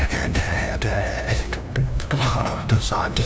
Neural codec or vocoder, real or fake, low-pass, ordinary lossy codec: codec, 16 kHz, 0.5 kbps, FunCodec, trained on LibriTTS, 25 frames a second; fake; none; none